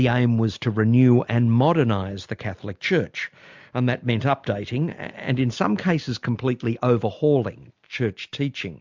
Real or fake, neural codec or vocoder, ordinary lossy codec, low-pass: real; none; MP3, 64 kbps; 7.2 kHz